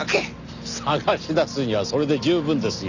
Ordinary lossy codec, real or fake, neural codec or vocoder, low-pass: none; real; none; 7.2 kHz